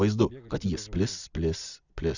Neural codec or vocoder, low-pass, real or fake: none; 7.2 kHz; real